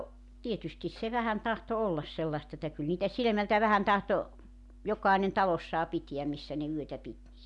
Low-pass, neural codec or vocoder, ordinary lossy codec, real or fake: 10.8 kHz; none; none; real